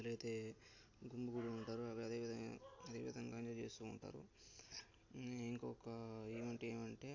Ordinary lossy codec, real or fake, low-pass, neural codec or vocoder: AAC, 48 kbps; real; 7.2 kHz; none